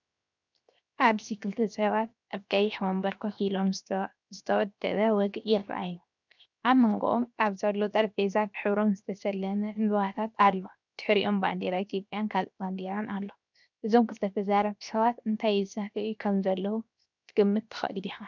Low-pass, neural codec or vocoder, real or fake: 7.2 kHz; codec, 16 kHz, 0.7 kbps, FocalCodec; fake